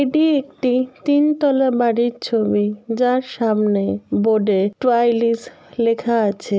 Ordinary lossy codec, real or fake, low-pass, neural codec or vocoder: none; real; none; none